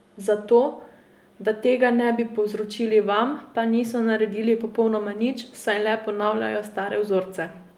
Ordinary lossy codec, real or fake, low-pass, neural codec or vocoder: Opus, 32 kbps; real; 19.8 kHz; none